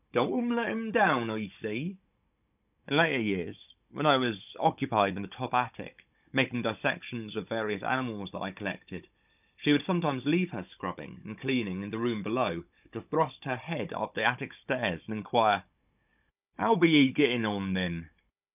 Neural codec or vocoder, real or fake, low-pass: codec, 16 kHz, 16 kbps, FunCodec, trained on Chinese and English, 50 frames a second; fake; 3.6 kHz